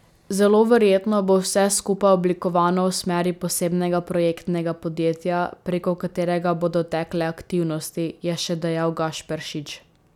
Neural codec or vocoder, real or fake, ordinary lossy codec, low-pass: none; real; none; 19.8 kHz